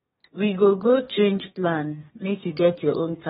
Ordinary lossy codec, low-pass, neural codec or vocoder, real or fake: AAC, 16 kbps; 14.4 kHz; codec, 32 kHz, 1.9 kbps, SNAC; fake